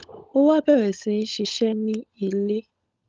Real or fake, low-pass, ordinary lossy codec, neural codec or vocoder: fake; 7.2 kHz; Opus, 32 kbps; codec, 16 kHz, 16 kbps, FunCodec, trained on LibriTTS, 50 frames a second